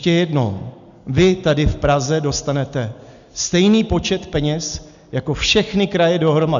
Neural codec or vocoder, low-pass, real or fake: none; 7.2 kHz; real